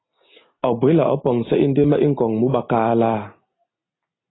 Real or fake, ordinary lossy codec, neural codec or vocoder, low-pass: real; AAC, 16 kbps; none; 7.2 kHz